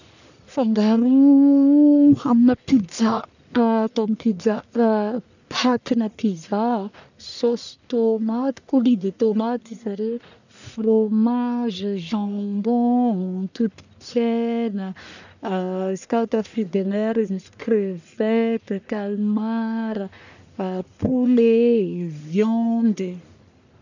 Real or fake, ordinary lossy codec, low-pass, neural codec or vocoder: fake; none; 7.2 kHz; codec, 44.1 kHz, 1.7 kbps, Pupu-Codec